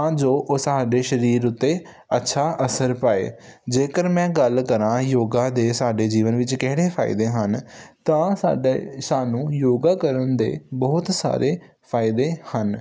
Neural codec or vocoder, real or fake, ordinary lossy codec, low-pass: none; real; none; none